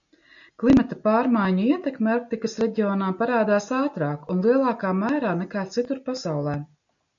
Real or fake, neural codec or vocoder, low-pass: real; none; 7.2 kHz